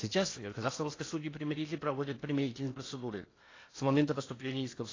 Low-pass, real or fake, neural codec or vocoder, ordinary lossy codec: 7.2 kHz; fake; codec, 16 kHz in and 24 kHz out, 0.8 kbps, FocalCodec, streaming, 65536 codes; AAC, 32 kbps